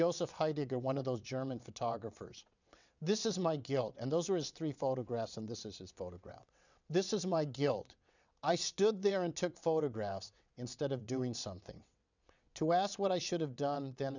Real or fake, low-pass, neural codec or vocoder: fake; 7.2 kHz; vocoder, 22.05 kHz, 80 mel bands, WaveNeXt